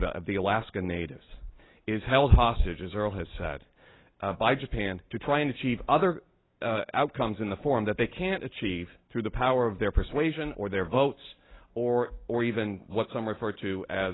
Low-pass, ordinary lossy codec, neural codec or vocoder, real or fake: 7.2 kHz; AAC, 16 kbps; none; real